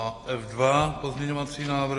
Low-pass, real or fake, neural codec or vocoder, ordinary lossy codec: 10.8 kHz; real; none; AAC, 32 kbps